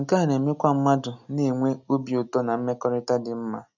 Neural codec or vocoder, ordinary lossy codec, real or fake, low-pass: none; none; real; 7.2 kHz